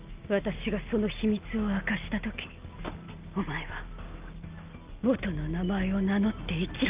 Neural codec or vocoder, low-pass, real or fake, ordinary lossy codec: none; 3.6 kHz; real; Opus, 24 kbps